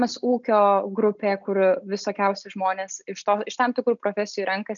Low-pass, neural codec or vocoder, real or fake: 7.2 kHz; none; real